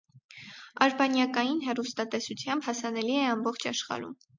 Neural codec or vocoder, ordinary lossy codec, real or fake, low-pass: none; MP3, 48 kbps; real; 7.2 kHz